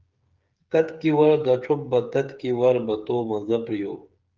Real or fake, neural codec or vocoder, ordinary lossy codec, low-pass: fake; codec, 16 kHz, 8 kbps, FreqCodec, smaller model; Opus, 32 kbps; 7.2 kHz